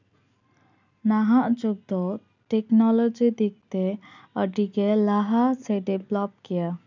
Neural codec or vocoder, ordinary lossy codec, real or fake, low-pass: none; none; real; 7.2 kHz